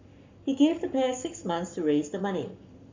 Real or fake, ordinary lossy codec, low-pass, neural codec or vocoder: fake; MP3, 64 kbps; 7.2 kHz; codec, 44.1 kHz, 7.8 kbps, Pupu-Codec